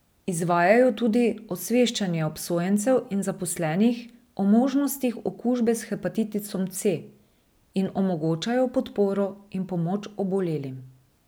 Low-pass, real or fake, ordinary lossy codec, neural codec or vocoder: none; real; none; none